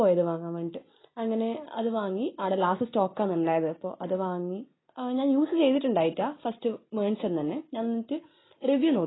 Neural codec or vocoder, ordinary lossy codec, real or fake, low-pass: none; AAC, 16 kbps; real; 7.2 kHz